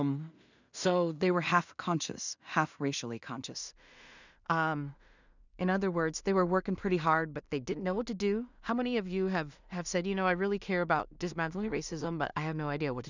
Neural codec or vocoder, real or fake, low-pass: codec, 16 kHz in and 24 kHz out, 0.4 kbps, LongCat-Audio-Codec, two codebook decoder; fake; 7.2 kHz